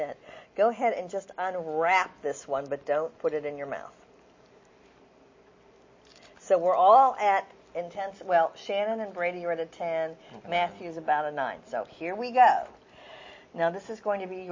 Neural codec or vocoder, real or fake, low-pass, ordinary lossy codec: none; real; 7.2 kHz; MP3, 32 kbps